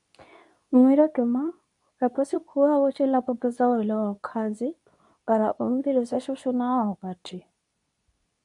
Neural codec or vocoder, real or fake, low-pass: codec, 24 kHz, 0.9 kbps, WavTokenizer, medium speech release version 2; fake; 10.8 kHz